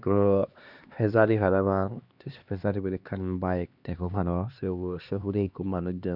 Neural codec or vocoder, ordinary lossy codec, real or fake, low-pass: codec, 16 kHz, 2 kbps, X-Codec, HuBERT features, trained on LibriSpeech; Opus, 64 kbps; fake; 5.4 kHz